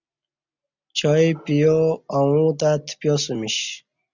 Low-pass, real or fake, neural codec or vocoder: 7.2 kHz; real; none